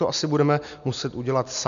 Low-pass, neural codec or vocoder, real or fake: 7.2 kHz; none; real